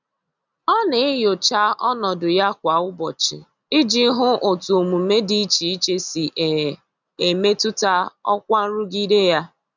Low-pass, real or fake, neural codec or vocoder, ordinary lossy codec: 7.2 kHz; real; none; none